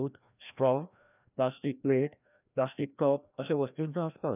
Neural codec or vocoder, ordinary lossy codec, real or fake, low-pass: codec, 16 kHz, 1 kbps, FreqCodec, larger model; none; fake; 3.6 kHz